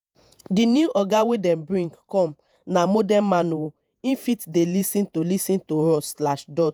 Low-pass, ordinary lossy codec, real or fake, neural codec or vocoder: none; none; fake; vocoder, 48 kHz, 128 mel bands, Vocos